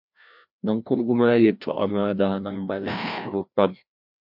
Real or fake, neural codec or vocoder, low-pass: fake; codec, 16 kHz, 1 kbps, FreqCodec, larger model; 5.4 kHz